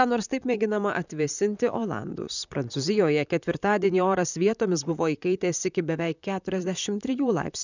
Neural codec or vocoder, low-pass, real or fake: vocoder, 44.1 kHz, 128 mel bands, Pupu-Vocoder; 7.2 kHz; fake